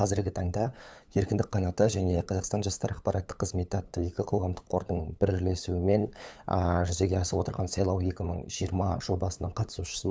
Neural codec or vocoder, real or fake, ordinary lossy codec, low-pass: codec, 16 kHz, 8 kbps, FunCodec, trained on LibriTTS, 25 frames a second; fake; none; none